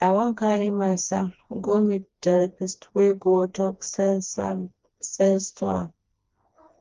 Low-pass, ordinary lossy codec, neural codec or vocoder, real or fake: 7.2 kHz; Opus, 32 kbps; codec, 16 kHz, 2 kbps, FreqCodec, smaller model; fake